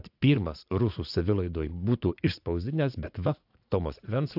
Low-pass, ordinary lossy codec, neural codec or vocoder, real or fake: 5.4 kHz; AAC, 48 kbps; vocoder, 44.1 kHz, 80 mel bands, Vocos; fake